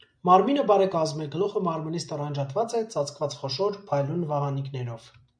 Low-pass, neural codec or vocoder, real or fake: 9.9 kHz; none; real